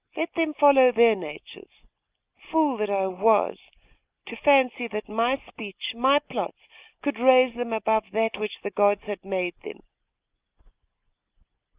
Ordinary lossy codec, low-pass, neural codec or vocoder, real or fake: Opus, 24 kbps; 3.6 kHz; none; real